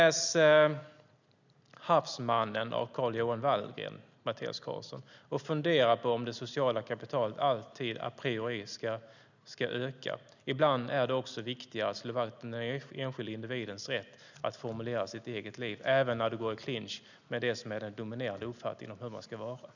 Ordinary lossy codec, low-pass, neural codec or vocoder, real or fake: none; 7.2 kHz; none; real